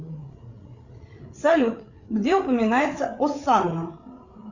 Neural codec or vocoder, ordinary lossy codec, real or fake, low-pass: codec, 16 kHz, 8 kbps, FreqCodec, larger model; Opus, 64 kbps; fake; 7.2 kHz